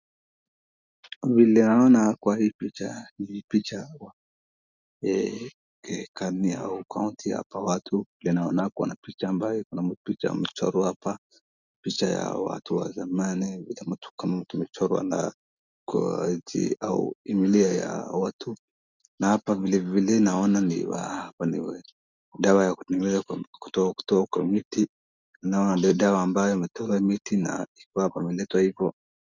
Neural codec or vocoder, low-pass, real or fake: none; 7.2 kHz; real